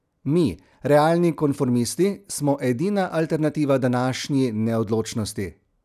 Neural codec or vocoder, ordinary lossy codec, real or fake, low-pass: none; none; real; 14.4 kHz